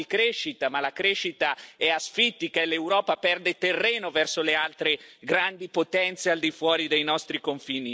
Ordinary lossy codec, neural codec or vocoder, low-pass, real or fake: none; none; none; real